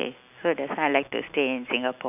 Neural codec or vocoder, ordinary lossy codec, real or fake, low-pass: none; none; real; 3.6 kHz